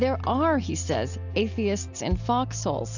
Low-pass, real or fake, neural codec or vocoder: 7.2 kHz; real; none